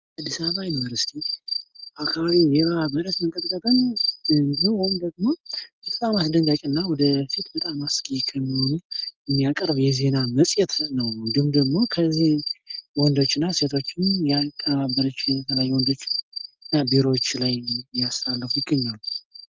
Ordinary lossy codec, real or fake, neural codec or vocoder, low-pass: Opus, 32 kbps; real; none; 7.2 kHz